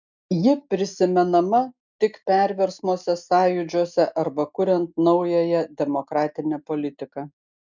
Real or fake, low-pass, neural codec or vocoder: real; 7.2 kHz; none